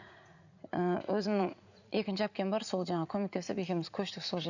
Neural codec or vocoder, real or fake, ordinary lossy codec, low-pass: vocoder, 44.1 kHz, 80 mel bands, Vocos; fake; none; 7.2 kHz